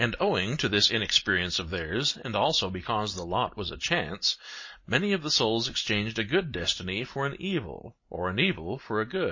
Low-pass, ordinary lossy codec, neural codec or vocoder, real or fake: 7.2 kHz; MP3, 32 kbps; none; real